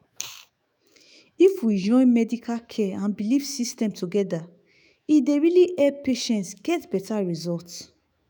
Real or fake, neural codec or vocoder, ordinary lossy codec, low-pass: fake; autoencoder, 48 kHz, 128 numbers a frame, DAC-VAE, trained on Japanese speech; none; none